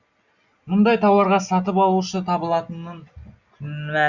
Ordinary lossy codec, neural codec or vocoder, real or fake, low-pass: Opus, 64 kbps; none; real; 7.2 kHz